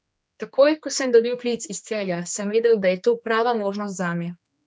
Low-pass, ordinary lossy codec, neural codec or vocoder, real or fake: none; none; codec, 16 kHz, 2 kbps, X-Codec, HuBERT features, trained on general audio; fake